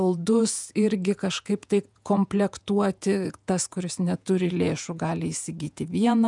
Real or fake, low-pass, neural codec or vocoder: fake; 10.8 kHz; vocoder, 48 kHz, 128 mel bands, Vocos